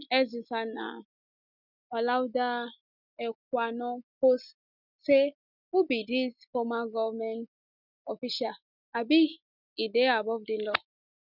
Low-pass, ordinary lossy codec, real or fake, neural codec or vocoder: 5.4 kHz; none; real; none